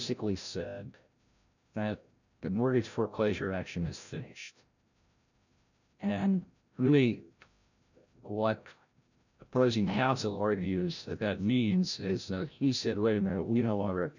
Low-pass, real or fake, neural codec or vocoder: 7.2 kHz; fake; codec, 16 kHz, 0.5 kbps, FreqCodec, larger model